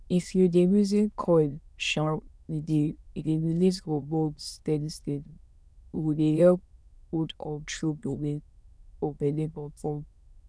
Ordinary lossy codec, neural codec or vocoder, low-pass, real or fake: none; autoencoder, 22.05 kHz, a latent of 192 numbers a frame, VITS, trained on many speakers; none; fake